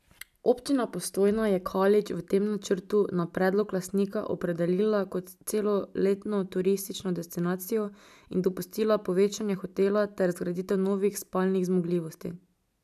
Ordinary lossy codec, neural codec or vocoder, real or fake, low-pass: none; none; real; 14.4 kHz